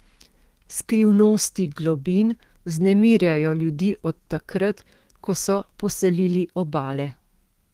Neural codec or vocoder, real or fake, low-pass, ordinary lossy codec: codec, 32 kHz, 1.9 kbps, SNAC; fake; 14.4 kHz; Opus, 24 kbps